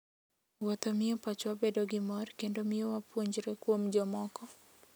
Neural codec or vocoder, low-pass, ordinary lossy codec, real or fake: none; none; none; real